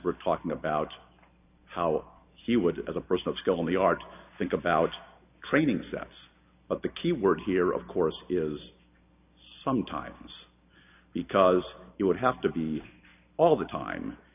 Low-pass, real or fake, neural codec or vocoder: 3.6 kHz; real; none